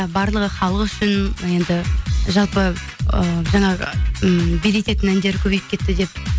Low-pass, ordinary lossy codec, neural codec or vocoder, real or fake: none; none; none; real